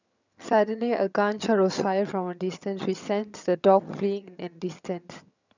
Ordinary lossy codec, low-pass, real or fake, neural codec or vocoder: none; 7.2 kHz; fake; vocoder, 22.05 kHz, 80 mel bands, HiFi-GAN